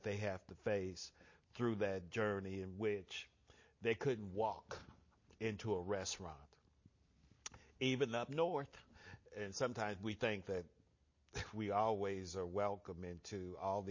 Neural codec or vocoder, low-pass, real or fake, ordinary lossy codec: none; 7.2 kHz; real; MP3, 32 kbps